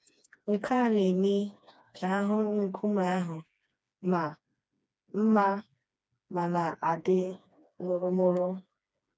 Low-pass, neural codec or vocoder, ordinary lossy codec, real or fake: none; codec, 16 kHz, 2 kbps, FreqCodec, smaller model; none; fake